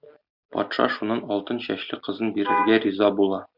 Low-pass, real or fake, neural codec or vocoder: 5.4 kHz; real; none